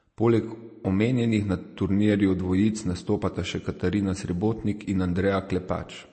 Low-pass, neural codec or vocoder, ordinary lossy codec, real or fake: 9.9 kHz; none; MP3, 32 kbps; real